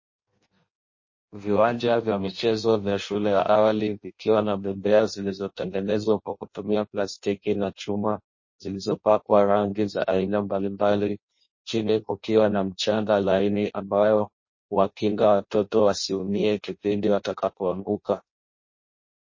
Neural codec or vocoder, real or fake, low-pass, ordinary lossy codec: codec, 16 kHz in and 24 kHz out, 0.6 kbps, FireRedTTS-2 codec; fake; 7.2 kHz; MP3, 32 kbps